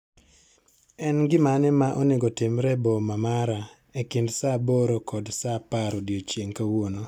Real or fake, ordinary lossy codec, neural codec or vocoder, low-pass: real; none; none; 19.8 kHz